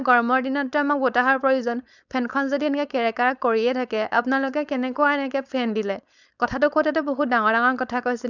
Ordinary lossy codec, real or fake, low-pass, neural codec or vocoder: none; fake; 7.2 kHz; codec, 16 kHz, 4.8 kbps, FACodec